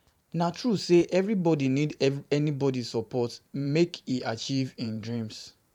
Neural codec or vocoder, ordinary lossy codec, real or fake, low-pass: vocoder, 44.1 kHz, 128 mel bands every 512 samples, BigVGAN v2; none; fake; 19.8 kHz